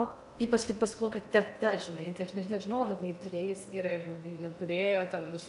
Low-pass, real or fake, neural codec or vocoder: 10.8 kHz; fake; codec, 16 kHz in and 24 kHz out, 0.8 kbps, FocalCodec, streaming, 65536 codes